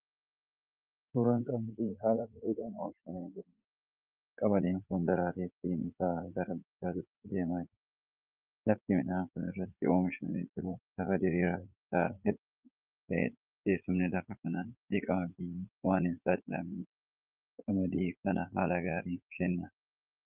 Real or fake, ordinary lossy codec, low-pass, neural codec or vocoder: fake; Opus, 24 kbps; 3.6 kHz; vocoder, 44.1 kHz, 80 mel bands, Vocos